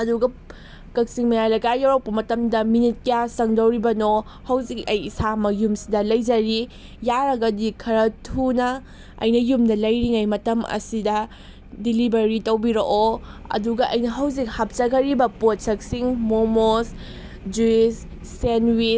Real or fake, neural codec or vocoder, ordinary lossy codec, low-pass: real; none; none; none